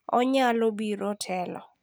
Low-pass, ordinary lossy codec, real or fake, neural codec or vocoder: none; none; real; none